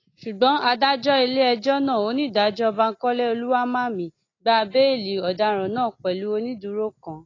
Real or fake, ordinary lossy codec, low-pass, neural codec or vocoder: real; AAC, 32 kbps; 7.2 kHz; none